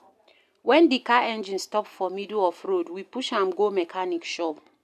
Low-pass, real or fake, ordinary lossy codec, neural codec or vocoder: 14.4 kHz; real; none; none